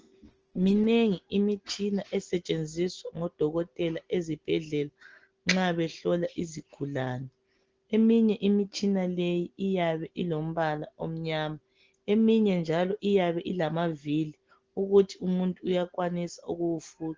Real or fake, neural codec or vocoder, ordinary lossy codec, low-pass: real; none; Opus, 16 kbps; 7.2 kHz